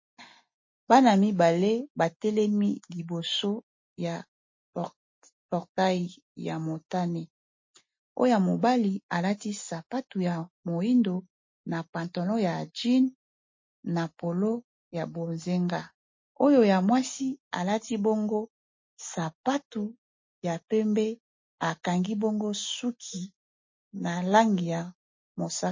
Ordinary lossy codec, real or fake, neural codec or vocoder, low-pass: MP3, 32 kbps; real; none; 7.2 kHz